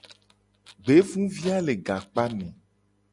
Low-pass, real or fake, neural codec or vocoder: 10.8 kHz; real; none